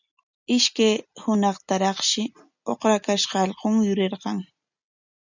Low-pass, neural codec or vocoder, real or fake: 7.2 kHz; none; real